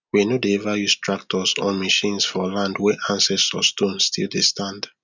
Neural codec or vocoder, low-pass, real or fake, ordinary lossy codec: none; 7.2 kHz; real; none